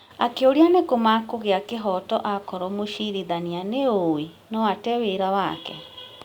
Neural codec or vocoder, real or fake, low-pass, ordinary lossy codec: none; real; 19.8 kHz; Opus, 64 kbps